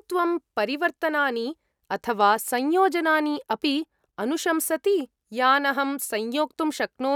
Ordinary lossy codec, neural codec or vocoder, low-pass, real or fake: none; none; 19.8 kHz; real